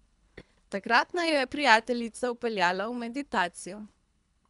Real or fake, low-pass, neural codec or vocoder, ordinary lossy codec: fake; 10.8 kHz; codec, 24 kHz, 3 kbps, HILCodec; none